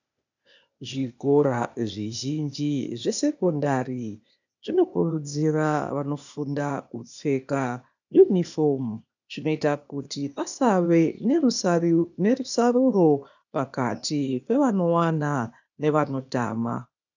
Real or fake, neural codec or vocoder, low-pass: fake; codec, 16 kHz, 0.8 kbps, ZipCodec; 7.2 kHz